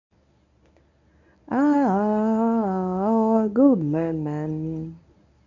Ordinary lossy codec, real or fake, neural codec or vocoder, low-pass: none; fake; codec, 24 kHz, 0.9 kbps, WavTokenizer, medium speech release version 1; 7.2 kHz